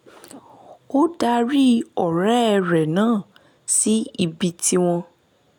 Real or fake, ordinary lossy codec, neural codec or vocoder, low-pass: real; none; none; 19.8 kHz